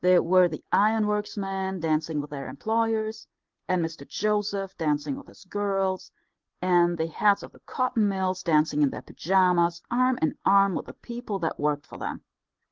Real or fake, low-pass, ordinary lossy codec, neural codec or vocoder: real; 7.2 kHz; Opus, 16 kbps; none